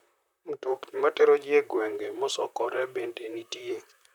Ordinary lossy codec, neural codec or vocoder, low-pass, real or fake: none; vocoder, 44.1 kHz, 128 mel bands, Pupu-Vocoder; 19.8 kHz; fake